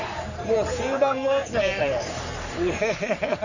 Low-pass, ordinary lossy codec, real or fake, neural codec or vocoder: 7.2 kHz; none; fake; codec, 44.1 kHz, 3.4 kbps, Pupu-Codec